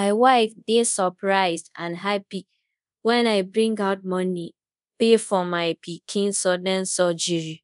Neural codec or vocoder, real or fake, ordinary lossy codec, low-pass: codec, 24 kHz, 0.5 kbps, DualCodec; fake; none; 10.8 kHz